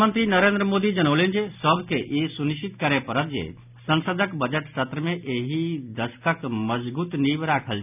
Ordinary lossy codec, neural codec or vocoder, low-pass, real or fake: none; none; 3.6 kHz; real